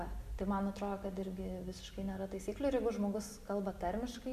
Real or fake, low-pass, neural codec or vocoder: real; 14.4 kHz; none